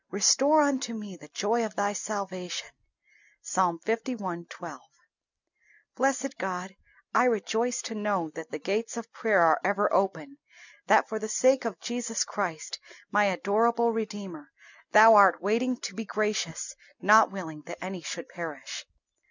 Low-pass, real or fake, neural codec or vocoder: 7.2 kHz; real; none